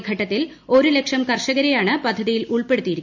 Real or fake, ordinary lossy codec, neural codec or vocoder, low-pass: real; none; none; 7.2 kHz